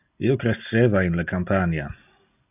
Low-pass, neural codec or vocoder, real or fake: 3.6 kHz; none; real